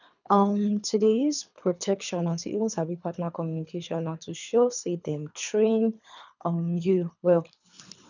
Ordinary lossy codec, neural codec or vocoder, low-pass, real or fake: none; codec, 24 kHz, 3 kbps, HILCodec; 7.2 kHz; fake